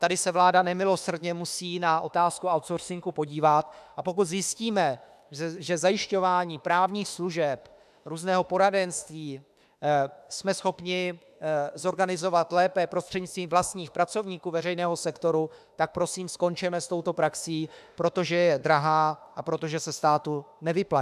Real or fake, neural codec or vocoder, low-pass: fake; autoencoder, 48 kHz, 32 numbers a frame, DAC-VAE, trained on Japanese speech; 14.4 kHz